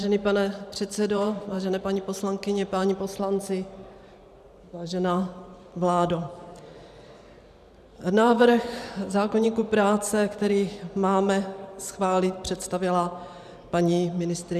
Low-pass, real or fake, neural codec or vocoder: 14.4 kHz; fake; vocoder, 44.1 kHz, 128 mel bands every 512 samples, BigVGAN v2